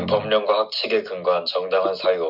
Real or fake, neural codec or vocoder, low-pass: real; none; 5.4 kHz